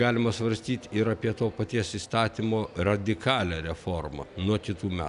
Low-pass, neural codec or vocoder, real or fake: 10.8 kHz; none; real